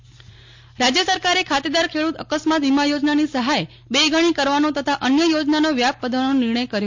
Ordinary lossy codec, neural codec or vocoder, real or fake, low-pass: MP3, 48 kbps; none; real; 7.2 kHz